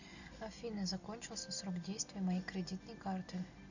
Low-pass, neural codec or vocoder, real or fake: 7.2 kHz; none; real